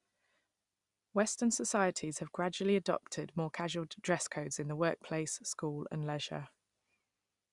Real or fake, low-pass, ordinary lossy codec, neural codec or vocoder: real; 10.8 kHz; Opus, 64 kbps; none